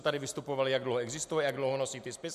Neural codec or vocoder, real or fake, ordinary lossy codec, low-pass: none; real; MP3, 96 kbps; 14.4 kHz